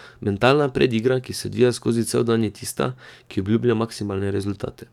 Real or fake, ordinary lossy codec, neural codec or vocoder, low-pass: fake; none; codec, 44.1 kHz, 7.8 kbps, DAC; 19.8 kHz